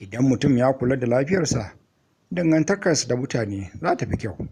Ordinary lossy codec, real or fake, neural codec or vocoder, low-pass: Opus, 64 kbps; real; none; 14.4 kHz